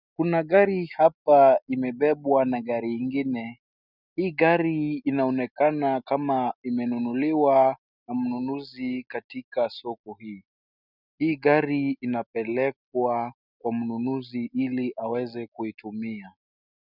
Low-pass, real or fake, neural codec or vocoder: 5.4 kHz; real; none